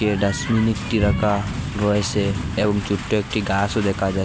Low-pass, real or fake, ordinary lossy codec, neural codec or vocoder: none; real; none; none